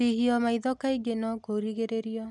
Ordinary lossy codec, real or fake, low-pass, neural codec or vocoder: none; real; 10.8 kHz; none